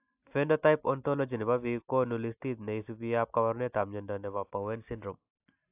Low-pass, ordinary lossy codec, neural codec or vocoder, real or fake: 3.6 kHz; AAC, 32 kbps; none; real